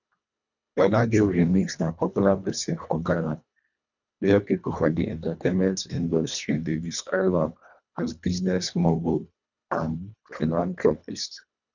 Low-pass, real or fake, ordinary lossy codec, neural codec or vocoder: 7.2 kHz; fake; none; codec, 24 kHz, 1.5 kbps, HILCodec